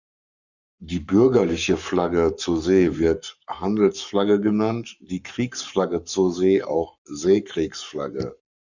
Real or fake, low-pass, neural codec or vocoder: fake; 7.2 kHz; codec, 44.1 kHz, 7.8 kbps, Pupu-Codec